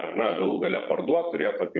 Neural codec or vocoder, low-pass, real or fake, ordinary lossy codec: vocoder, 22.05 kHz, 80 mel bands, Vocos; 7.2 kHz; fake; MP3, 48 kbps